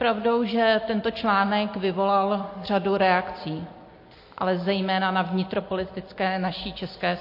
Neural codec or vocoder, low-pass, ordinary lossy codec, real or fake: none; 5.4 kHz; MP3, 32 kbps; real